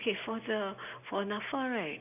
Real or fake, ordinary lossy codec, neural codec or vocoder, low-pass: real; none; none; 3.6 kHz